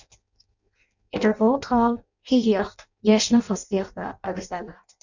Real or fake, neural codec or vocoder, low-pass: fake; codec, 16 kHz in and 24 kHz out, 0.6 kbps, FireRedTTS-2 codec; 7.2 kHz